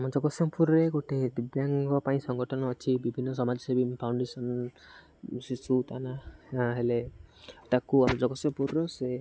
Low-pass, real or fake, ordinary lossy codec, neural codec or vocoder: none; real; none; none